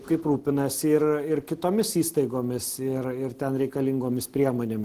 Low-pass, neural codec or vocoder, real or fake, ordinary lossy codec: 14.4 kHz; none; real; Opus, 16 kbps